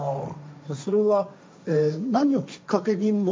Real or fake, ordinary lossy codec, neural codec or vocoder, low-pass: fake; none; codec, 16 kHz, 1.1 kbps, Voila-Tokenizer; none